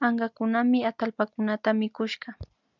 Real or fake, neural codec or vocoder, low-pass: real; none; 7.2 kHz